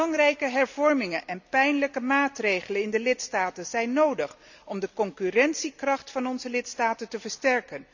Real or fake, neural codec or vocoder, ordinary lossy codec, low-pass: real; none; none; 7.2 kHz